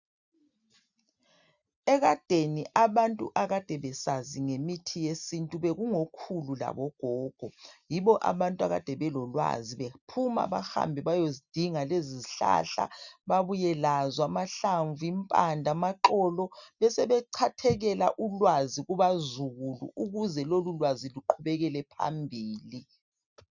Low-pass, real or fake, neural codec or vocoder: 7.2 kHz; real; none